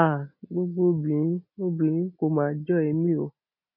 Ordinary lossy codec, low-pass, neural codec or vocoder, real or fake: none; 5.4 kHz; none; real